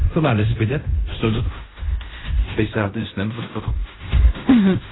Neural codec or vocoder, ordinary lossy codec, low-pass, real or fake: codec, 16 kHz in and 24 kHz out, 0.4 kbps, LongCat-Audio-Codec, fine tuned four codebook decoder; AAC, 16 kbps; 7.2 kHz; fake